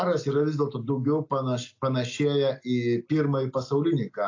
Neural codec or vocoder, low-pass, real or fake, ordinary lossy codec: none; 7.2 kHz; real; AAC, 48 kbps